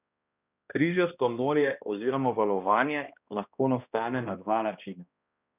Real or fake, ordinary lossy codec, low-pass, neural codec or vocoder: fake; none; 3.6 kHz; codec, 16 kHz, 1 kbps, X-Codec, HuBERT features, trained on balanced general audio